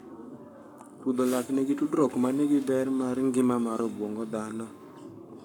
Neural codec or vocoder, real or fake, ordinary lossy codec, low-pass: codec, 44.1 kHz, 7.8 kbps, Pupu-Codec; fake; none; 19.8 kHz